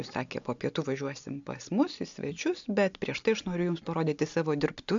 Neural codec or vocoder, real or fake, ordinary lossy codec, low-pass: none; real; AAC, 64 kbps; 7.2 kHz